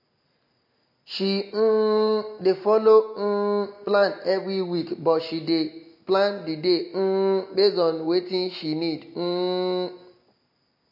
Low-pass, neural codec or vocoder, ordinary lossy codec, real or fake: 5.4 kHz; none; MP3, 32 kbps; real